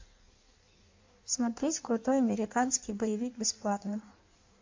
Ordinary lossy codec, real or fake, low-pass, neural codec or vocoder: MP3, 48 kbps; fake; 7.2 kHz; codec, 16 kHz in and 24 kHz out, 1.1 kbps, FireRedTTS-2 codec